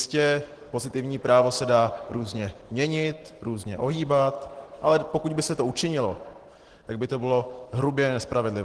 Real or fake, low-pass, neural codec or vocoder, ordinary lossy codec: real; 10.8 kHz; none; Opus, 16 kbps